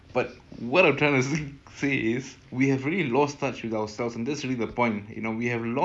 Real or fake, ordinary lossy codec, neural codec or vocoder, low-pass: real; none; none; none